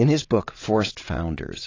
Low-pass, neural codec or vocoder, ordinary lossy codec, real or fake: 7.2 kHz; vocoder, 22.05 kHz, 80 mel bands, WaveNeXt; AAC, 32 kbps; fake